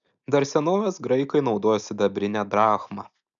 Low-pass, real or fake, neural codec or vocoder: 7.2 kHz; real; none